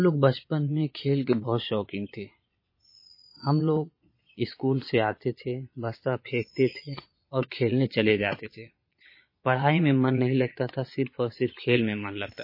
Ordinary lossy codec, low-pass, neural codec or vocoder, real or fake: MP3, 24 kbps; 5.4 kHz; vocoder, 22.05 kHz, 80 mel bands, Vocos; fake